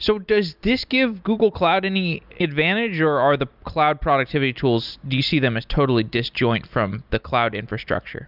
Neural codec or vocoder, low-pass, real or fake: none; 5.4 kHz; real